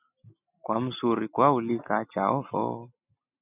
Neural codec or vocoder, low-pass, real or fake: none; 3.6 kHz; real